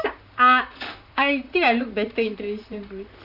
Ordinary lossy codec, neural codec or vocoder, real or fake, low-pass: none; vocoder, 44.1 kHz, 128 mel bands, Pupu-Vocoder; fake; 5.4 kHz